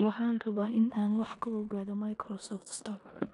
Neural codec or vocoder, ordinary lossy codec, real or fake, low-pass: codec, 16 kHz in and 24 kHz out, 0.9 kbps, LongCat-Audio-Codec, four codebook decoder; none; fake; 10.8 kHz